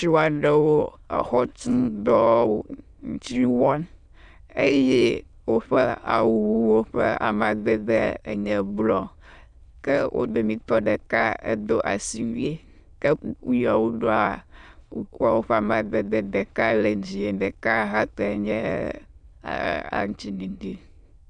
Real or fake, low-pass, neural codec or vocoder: fake; 9.9 kHz; autoencoder, 22.05 kHz, a latent of 192 numbers a frame, VITS, trained on many speakers